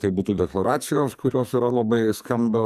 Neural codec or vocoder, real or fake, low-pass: codec, 44.1 kHz, 2.6 kbps, SNAC; fake; 14.4 kHz